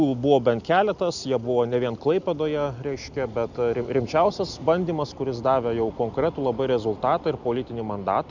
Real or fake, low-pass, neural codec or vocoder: real; 7.2 kHz; none